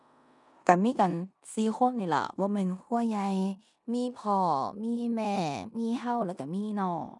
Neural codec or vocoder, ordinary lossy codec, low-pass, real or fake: codec, 16 kHz in and 24 kHz out, 0.9 kbps, LongCat-Audio-Codec, four codebook decoder; none; 10.8 kHz; fake